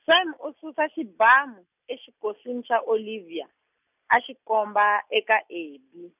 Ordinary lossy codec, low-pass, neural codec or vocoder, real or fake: none; 3.6 kHz; none; real